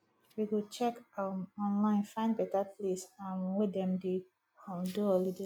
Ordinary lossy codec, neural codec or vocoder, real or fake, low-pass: none; none; real; 19.8 kHz